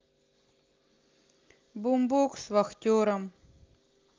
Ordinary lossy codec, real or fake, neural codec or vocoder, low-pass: Opus, 24 kbps; real; none; 7.2 kHz